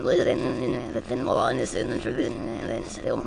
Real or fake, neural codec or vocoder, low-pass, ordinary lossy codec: fake; autoencoder, 22.05 kHz, a latent of 192 numbers a frame, VITS, trained on many speakers; 9.9 kHz; AAC, 48 kbps